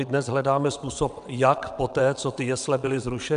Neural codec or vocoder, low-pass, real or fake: vocoder, 22.05 kHz, 80 mel bands, WaveNeXt; 9.9 kHz; fake